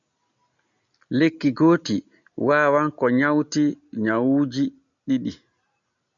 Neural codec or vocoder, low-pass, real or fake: none; 7.2 kHz; real